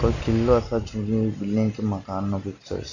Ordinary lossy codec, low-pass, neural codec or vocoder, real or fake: none; 7.2 kHz; none; real